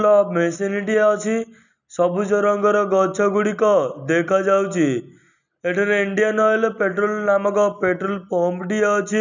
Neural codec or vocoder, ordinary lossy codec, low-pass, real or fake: none; none; 7.2 kHz; real